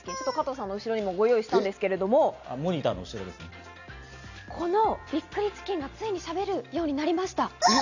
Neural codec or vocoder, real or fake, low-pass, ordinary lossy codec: none; real; 7.2 kHz; none